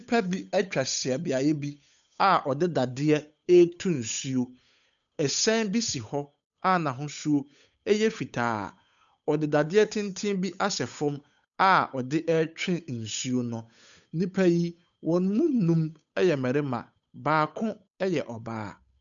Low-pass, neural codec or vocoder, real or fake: 7.2 kHz; codec, 16 kHz, 8 kbps, FunCodec, trained on Chinese and English, 25 frames a second; fake